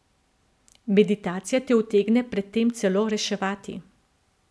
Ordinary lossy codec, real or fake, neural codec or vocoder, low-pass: none; real; none; none